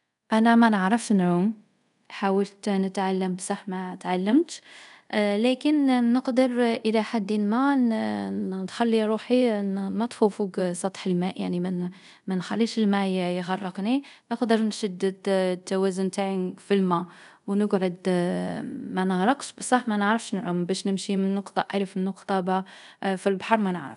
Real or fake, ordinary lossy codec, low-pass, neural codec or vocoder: fake; none; 10.8 kHz; codec, 24 kHz, 0.5 kbps, DualCodec